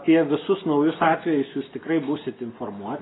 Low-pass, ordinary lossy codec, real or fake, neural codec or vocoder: 7.2 kHz; AAC, 16 kbps; real; none